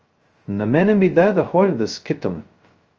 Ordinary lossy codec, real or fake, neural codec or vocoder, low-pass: Opus, 24 kbps; fake; codec, 16 kHz, 0.2 kbps, FocalCodec; 7.2 kHz